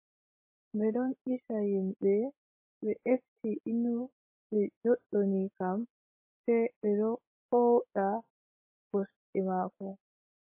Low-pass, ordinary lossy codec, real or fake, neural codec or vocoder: 3.6 kHz; MP3, 24 kbps; real; none